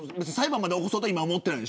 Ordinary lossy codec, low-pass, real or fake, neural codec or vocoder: none; none; real; none